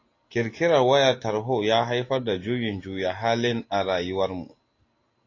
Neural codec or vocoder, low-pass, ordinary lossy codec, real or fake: none; 7.2 kHz; AAC, 32 kbps; real